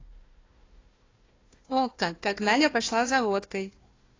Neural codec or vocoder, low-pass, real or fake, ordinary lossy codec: codec, 16 kHz, 1 kbps, FunCodec, trained on Chinese and English, 50 frames a second; 7.2 kHz; fake; AAC, 32 kbps